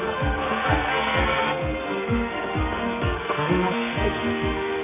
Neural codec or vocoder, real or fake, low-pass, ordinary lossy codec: codec, 44.1 kHz, 2.6 kbps, SNAC; fake; 3.6 kHz; AAC, 24 kbps